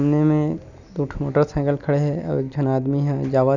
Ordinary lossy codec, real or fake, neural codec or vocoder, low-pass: none; real; none; 7.2 kHz